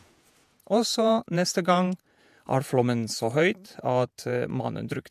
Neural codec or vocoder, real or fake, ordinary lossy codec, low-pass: vocoder, 48 kHz, 128 mel bands, Vocos; fake; MP3, 96 kbps; 14.4 kHz